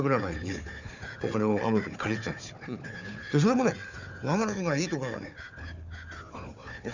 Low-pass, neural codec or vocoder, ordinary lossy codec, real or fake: 7.2 kHz; codec, 16 kHz, 4 kbps, FunCodec, trained on Chinese and English, 50 frames a second; none; fake